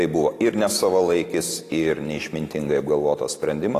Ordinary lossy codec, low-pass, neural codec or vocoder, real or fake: AAC, 48 kbps; 14.4 kHz; none; real